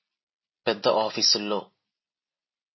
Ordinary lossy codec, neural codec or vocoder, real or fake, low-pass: MP3, 24 kbps; none; real; 7.2 kHz